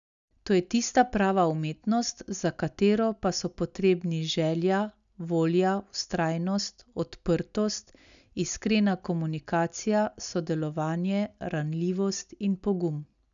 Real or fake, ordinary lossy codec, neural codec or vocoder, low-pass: real; none; none; 7.2 kHz